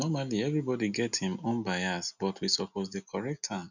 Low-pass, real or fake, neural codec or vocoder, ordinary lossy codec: 7.2 kHz; real; none; none